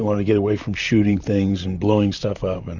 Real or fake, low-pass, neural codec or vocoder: fake; 7.2 kHz; vocoder, 44.1 kHz, 128 mel bands, Pupu-Vocoder